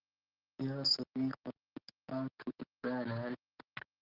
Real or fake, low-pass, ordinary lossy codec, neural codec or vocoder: real; 5.4 kHz; Opus, 16 kbps; none